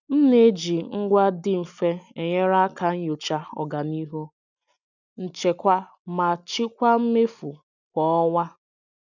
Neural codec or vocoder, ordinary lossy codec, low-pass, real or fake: none; none; 7.2 kHz; real